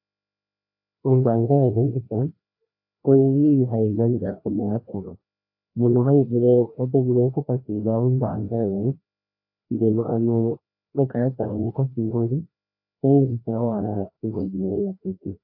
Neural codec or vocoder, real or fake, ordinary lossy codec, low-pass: codec, 16 kHz, 1 kbps, FreqCodec, larger model; fake; AAC, 32 kbps; 5.4 kHz